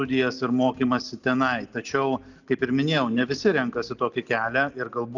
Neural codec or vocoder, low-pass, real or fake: none; 7.2 kHz; real